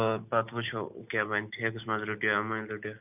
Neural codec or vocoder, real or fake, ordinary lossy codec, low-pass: none; real; none; 3.6 kHz